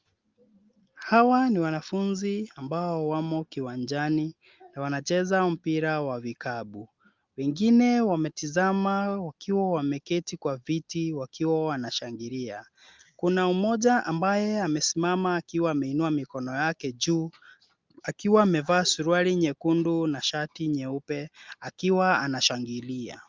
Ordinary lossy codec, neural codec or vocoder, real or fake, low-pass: Opus, 24 kbps; none; real; 7.2 kHz